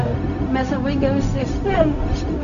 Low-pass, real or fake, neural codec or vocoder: 7.2 kHz; fake; codec, 16 kHz, 0.4 kbps, LongCat-Audio-Codec